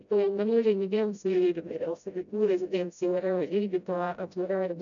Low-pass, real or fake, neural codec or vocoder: 7.2 kHz; fake; codec, 16 kHz, 0.5 kbps, FreqCodec, smaller model